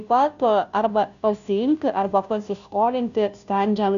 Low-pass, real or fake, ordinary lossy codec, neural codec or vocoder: 7.2 kHz; fake; MP3, 96 kbps; codec, 16 kHz, 0.5 kbps, FunCodec, trained on Chinese and English, 25 frames a second